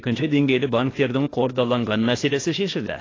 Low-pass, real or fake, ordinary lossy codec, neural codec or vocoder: 7.2 kHz; fake; AAC, 32 kbps; codec, 16 kHz, 0.8 kbps, ZipCodec